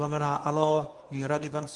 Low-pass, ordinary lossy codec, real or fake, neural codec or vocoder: 10.8 kHz; Opus, 32 kbps; fake; codec, 24 kHz, 0.9 kbps, WavTokenizer, medium speech release version 1